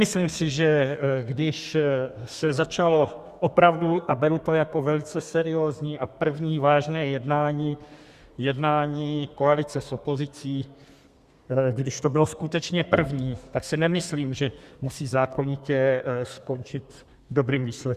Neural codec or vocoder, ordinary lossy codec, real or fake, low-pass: codec, 32 kHz, 1.9 kbps, SNAC; Opus, 64 kbps; fake; 14.4 kHz